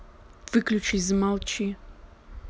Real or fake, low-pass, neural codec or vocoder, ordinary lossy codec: real; none; none; none